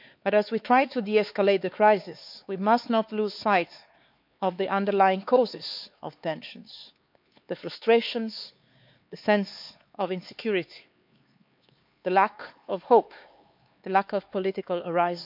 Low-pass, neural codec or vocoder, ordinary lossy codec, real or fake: 5.4 kHz; codec, 16 kHz, 4 kbps, X-Codec, HuBERT features, trained on LibriSpeech; MP3, 48 kbps; fake